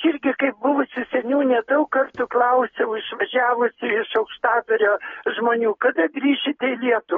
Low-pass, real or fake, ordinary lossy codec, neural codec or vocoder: 7.2 kHz; real; AAC, 24 kbps; none